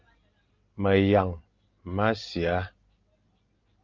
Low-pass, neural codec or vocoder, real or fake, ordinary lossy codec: 7.2 kHz; none; real; Opus, 24 kbps